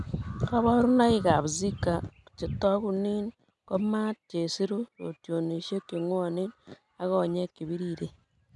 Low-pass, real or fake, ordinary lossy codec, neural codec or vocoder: 10.8 kHz; real; none; none